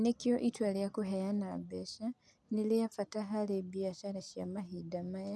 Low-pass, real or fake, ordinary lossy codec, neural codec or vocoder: none; real; none; none